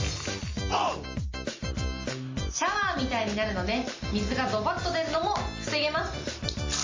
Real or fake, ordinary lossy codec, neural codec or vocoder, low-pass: real; MP3, 32 kbps; none; 7.2 kHz